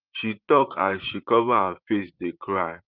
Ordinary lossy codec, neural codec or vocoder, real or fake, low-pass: Opus, 32 kbps; vocoder, 44.1 kHz, 128 mel bands, Pupu-Vocoder; fake; 5.4 kHz